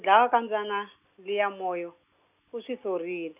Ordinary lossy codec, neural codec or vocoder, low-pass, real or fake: none; none; 3.6 kHz; real